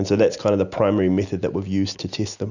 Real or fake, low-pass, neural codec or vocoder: real; 7.2 kHz; none